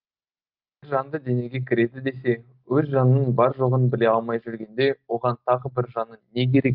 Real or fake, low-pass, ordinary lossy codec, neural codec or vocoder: real; 5.4 kHz; Opus, 24 kbps; none